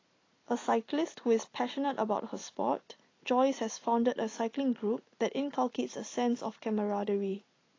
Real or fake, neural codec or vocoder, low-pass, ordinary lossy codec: real; none; 7.2 kHz; AAC, 32 kbps